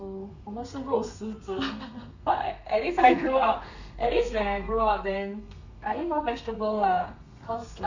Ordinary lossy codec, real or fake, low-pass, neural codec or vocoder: none; fake; 7.2 kHz; codec, 32 kHz, 1.9 kbps, SNAC